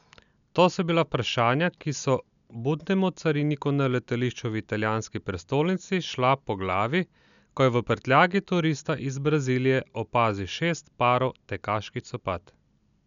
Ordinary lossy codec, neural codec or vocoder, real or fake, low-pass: none; none; real; 7.2 kHz